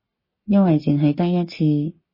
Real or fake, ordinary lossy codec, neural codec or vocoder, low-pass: fake; MP3, 24 kbps; codec, 44.1 kHz, 7.8 kbps, Pupu-Codec; 5.4 kHz